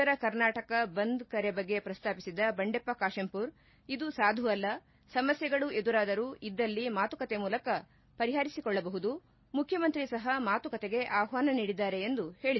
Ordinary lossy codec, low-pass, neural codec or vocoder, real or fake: MP3, 24 kbps; 7.2 kHz; none; real